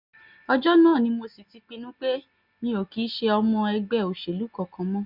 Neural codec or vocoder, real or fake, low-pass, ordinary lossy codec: none; real; 5.4 kHz; none